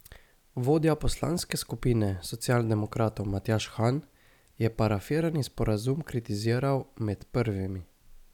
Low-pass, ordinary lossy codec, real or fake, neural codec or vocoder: 19.8 kHz; none; real; none